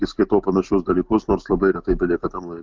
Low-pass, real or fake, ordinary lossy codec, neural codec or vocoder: 7.2 kHz; real; Opus, 32 kbps; none